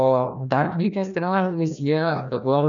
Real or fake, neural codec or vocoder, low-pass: fake; codec, 16 kHz, 1 kbps, FreqCodec, larger model; 7.2 kHz